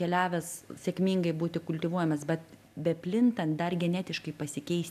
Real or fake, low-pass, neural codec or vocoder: real; 14.4 kHz; none